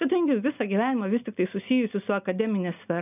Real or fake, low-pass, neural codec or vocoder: real; 3.6 kHz; none